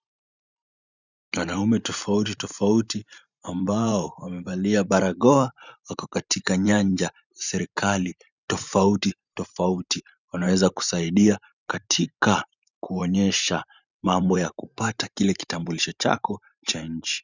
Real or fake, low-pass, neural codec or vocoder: fake; 7.2 kHz; vocoder, 44.1 kHz, 128 mel bands every 512 samples, BigVGAN v2